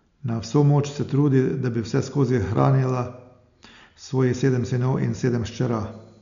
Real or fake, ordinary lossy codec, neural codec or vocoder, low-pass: real; none; none; 7.2 kHz